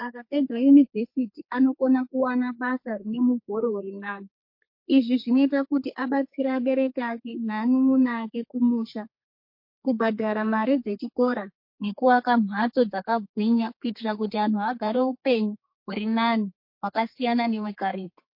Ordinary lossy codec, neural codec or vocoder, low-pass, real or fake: MP3, 32 kbps; codec, 32 kHz, 1.9 kbps, SNAC; 5.4 kHz; fake